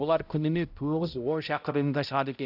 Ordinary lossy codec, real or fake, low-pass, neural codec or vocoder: none; fake; 5.4 kHz; codec, 16 kHz, 0.5 kbps, X-Codec, HuBERT features, trained on balanced general audio